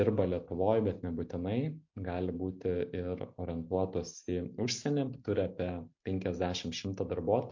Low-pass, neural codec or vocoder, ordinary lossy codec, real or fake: 7.2 kHz; none; MP3, 48 kbps; real